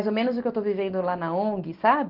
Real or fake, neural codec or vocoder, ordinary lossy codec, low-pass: real; none; Opus, 16 kbps; 5.4 kHz